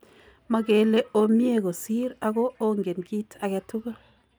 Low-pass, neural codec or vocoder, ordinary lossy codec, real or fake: none; none; none; real